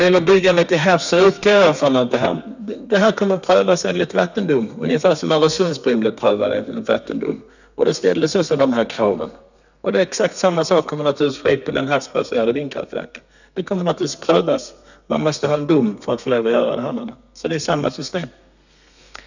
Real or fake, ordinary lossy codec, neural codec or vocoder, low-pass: fake; none; codec, 32 kHz, 1.9 kbps, SNAC; 7.2 kHz